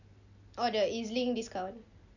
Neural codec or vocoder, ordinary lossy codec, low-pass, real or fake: none; none; 7.2 kHz; real